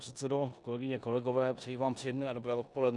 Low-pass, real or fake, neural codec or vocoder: 10.8 kHz; fake; codec, 16 kHz in and 24 kHz out, 0.9 kbps, LongCat-Audio-Codec, four codebook decoder